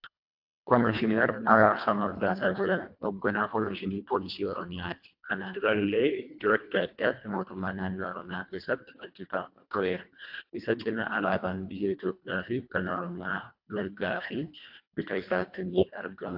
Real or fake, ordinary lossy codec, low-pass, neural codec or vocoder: fake; AAC, 48 kbps; 5.4 kHz; codec, 24 kHz, 1.5 kbps, HILCodec